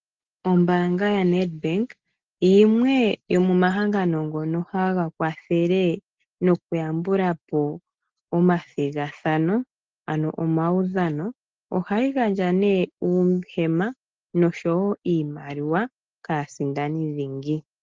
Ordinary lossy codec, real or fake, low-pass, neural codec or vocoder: Opus, 16 kbps; real; 7.2 kHz; none